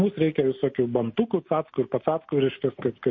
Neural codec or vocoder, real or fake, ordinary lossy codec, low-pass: none; real; MP3, 32 kbps; 7.2 kHz